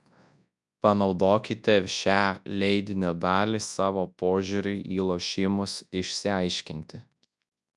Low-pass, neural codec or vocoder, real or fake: 10.8 kHz; codec, 24 kHz, 0.9 kbps, WavTokenizer, large speech release; fake